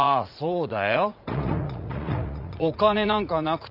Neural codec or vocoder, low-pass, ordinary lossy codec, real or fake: vocoder, 44.1 kHz, 80 mel bands, Vocos; 5.4 kHz; none; fake